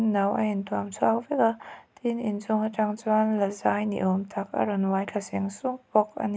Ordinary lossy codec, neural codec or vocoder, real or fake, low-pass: none; none; real; none